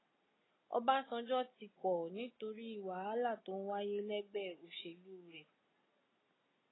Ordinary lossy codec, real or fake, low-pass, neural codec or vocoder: AAC, 16 kbps; real; 7.2 kHz; none